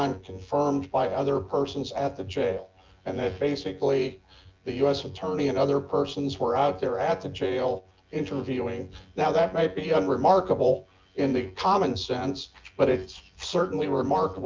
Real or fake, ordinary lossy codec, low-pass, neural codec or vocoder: fake; Opus, 24 kbps; 7.2 kHz; vocoder, 24 kHz, 100 mel bands, Vocos